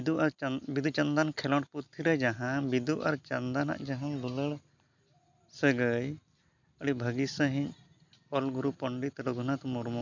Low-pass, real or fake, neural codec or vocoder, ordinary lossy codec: 7.2 kHz; real; none; MP3, 64 kbps